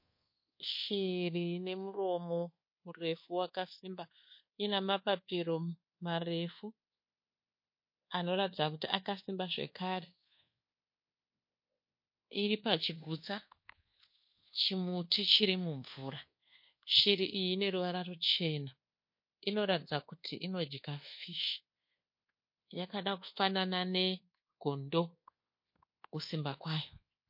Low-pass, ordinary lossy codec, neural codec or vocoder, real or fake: 5.4 kHz; MP3, 32 kbps; codec, 24 kHz, 1.2 kbps, DualCodec; fake